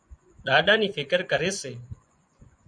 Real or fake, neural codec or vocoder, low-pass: fake; vocoder, 24 kHz, 100 mel bands, Vocos; 9.9 kHz